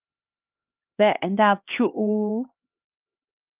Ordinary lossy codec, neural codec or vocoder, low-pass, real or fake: Opus, 24 kbps; codec, 16 kHz, 2 kbps, X-Codec, HuBERT features, trained on LibriSpeech; 3.6 kHz; fake